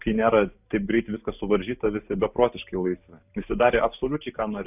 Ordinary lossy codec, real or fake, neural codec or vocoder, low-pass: AAC, 32 kbps; real; none; 3.6 kHz